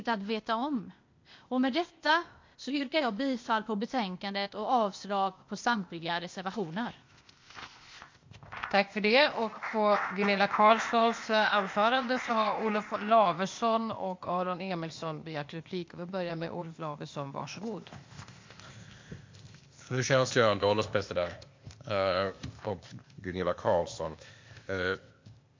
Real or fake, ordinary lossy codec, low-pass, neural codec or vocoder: fake; MP3, 48 kbps; 7.2 kHz; codec, 16 kHz, 0.8 kbps, ZipCodec